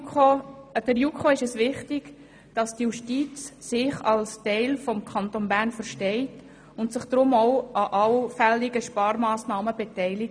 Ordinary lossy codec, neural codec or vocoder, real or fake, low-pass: none; none; real; 9.9 kHz